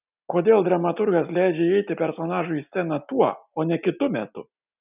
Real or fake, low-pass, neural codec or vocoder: real; 3.6 kHz; none